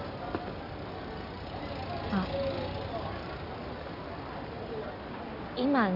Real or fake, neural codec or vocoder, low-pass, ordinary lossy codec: real; none; 5.4 kHz; none